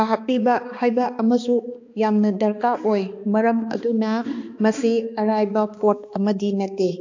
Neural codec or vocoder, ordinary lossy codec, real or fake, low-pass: codec, 16 kHz, 2 kbps, X-Codec, HuBERT features, trained on balanced general audio; AAC, 48 kbps; fake; 7.2 kHz